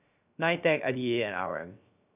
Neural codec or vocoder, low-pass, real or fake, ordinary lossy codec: codec, 16 kHz, 0.3 kbps, FocalCodec; 3.6 kHz; fake; none